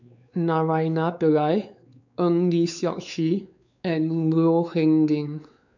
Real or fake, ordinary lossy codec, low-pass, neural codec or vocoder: fake; none; 7.2 kHz; codec, 16 kHz, 4 kbps, X-Codec, WavLM features, trained on Multilingual LibriSpeech